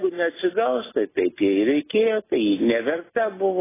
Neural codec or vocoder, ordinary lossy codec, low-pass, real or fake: none; AAC, 16 kbps; 3.6 kHz; real